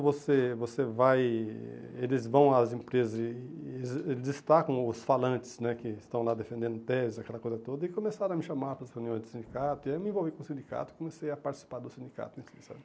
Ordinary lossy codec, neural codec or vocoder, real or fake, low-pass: none; none; real; none